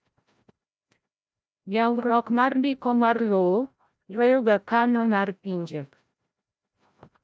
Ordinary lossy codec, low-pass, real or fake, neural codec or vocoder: none; none; fake; codec, 16 kHz, 0.5 kbps, FreqCodec, larger model